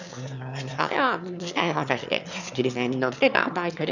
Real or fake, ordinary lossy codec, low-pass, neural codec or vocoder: fake; none; 7.2 kHz; autoencoder, 22.05 kHz, a latent of 192 numbers a frame, VITS, trained on one speaker